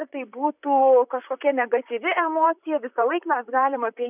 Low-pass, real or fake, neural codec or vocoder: 3.6 kHz; fake; vocoder, 44.1 kHz, 128 mel bands, Pupu-Vocoder